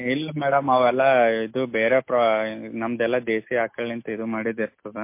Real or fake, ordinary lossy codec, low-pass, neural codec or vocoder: real; MP3, 24 kbps; 3.6 kHz; none